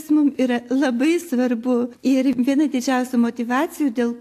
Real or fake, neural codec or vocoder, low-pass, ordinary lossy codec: real; none; 14.4 kHz; AAC, 64 kbps